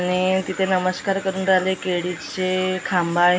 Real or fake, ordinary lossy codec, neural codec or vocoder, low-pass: real; none; none; none